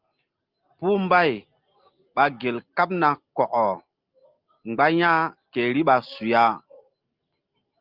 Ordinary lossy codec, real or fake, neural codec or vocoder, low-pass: Opus, 24 kbps; real; none; 5.4 kHz